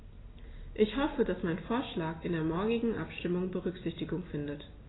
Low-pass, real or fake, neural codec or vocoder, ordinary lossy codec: 7.2 kHz; real; none; AAC, 16 kbps